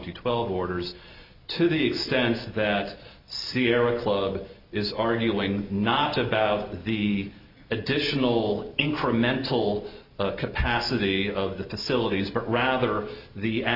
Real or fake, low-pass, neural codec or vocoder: real; 5.4 kHz; none